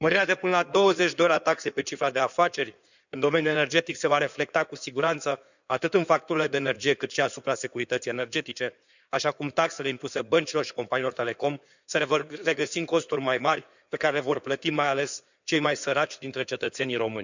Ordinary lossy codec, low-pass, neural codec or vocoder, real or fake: none; 7.2 kHz; codec, 16 kHz in and 24 kHz out, 2.2 kbps, FireRedTTS-2 codec; fake